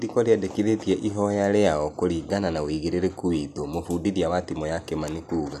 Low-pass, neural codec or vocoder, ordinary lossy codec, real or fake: 14.4 kHz; none; none; real